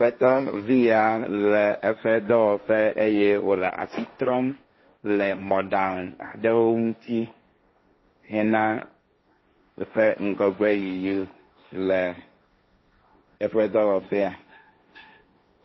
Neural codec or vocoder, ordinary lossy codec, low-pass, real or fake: codec, 16 kHz, 1.1 kbps, Voila-Tokenizer; MP3, 24 kbps; 7.2 kHz; fake